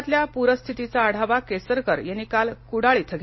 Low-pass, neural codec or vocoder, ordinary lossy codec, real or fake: 7.2 kHz; none; MP3, 24 kbps; real